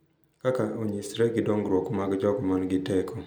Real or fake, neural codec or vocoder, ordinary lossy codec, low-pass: real; none; none; none